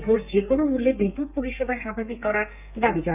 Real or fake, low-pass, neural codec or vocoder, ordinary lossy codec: fake; 3.6 kHz; codec, 32 kHz, 1.9 kbps, SNAC; none